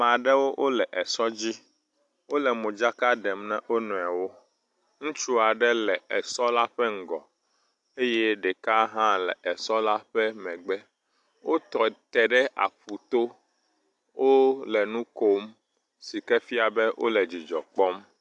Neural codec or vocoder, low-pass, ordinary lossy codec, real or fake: none; 10.8 kHz; AAC, 64 kbps; real